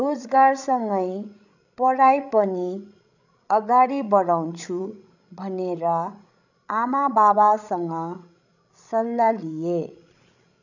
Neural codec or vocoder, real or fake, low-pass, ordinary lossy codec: codec, 16 kHz, 16 kbps, FreqCodec, larger model; fake; 7.2 kHz; none